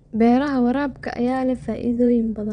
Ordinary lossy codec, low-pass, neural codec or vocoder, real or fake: MP3, 96 kbps; 9.9 kHz; vocoder, 22.05 kHz, 80 mel bands, WaveNeXt; fake